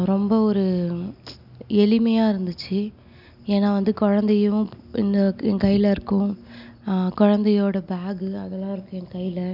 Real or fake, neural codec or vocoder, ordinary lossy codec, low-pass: real; none; none; 5.4 kHz